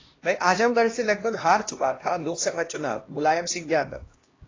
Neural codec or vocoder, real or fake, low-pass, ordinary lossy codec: codec, 16 kHz, 1 kbps, X-Codec, HuBERT features, trained on LibriSpeech; fake; 7.2 kHz; AAC, 32 kbps